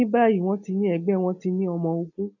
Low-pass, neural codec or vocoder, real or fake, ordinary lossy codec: 7.2 kHz; none; real; none